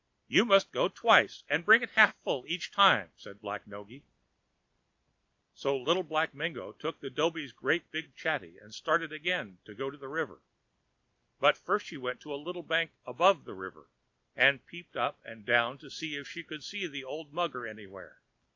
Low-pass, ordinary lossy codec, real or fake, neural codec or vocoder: 7.2 kHz; AAC, 48 kbps; real; none